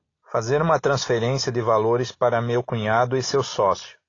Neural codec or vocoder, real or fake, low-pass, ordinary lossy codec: none; real; 7.2 kHz; AAC, 32 kbps